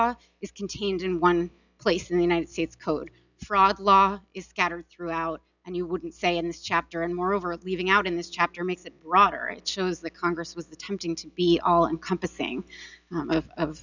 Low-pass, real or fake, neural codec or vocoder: 7.2 kHz; real; none